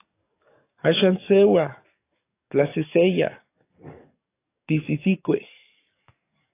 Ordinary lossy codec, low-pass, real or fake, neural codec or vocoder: AAC, 24 kbps; 3.6 kHz; fake; codec, 44.1 kHz, 7.8 kbps, DAC